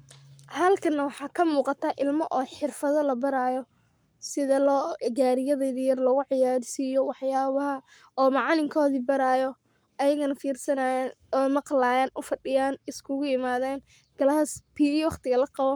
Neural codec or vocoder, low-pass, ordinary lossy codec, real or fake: codec, 44.1 kHz, 7.8 kbps, Pupu-Codec; none; none; fake